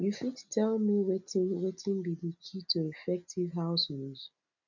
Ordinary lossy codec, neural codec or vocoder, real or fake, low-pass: none; none; real; 7.2 kHz